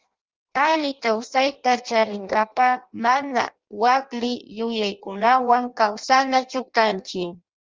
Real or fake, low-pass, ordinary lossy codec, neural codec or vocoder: fake; 7.2 kHz; Opus, 32 kbps; codec, 16 kHz in and 24 kHz out, 0.6 kbps, FireRedTTS-2 codec